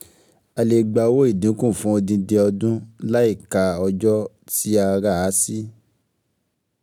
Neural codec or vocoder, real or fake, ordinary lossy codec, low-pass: none; real; none; none